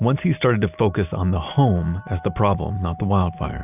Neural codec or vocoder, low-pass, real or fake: none; 3.6 kHz; real